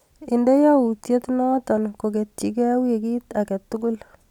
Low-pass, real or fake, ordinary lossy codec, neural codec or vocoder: 19.8 kHz; real; none; none